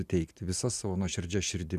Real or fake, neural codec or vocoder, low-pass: real; none; 14.4 kHz